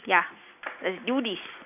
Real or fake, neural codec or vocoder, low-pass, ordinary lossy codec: real; none; 3.6 kHz; none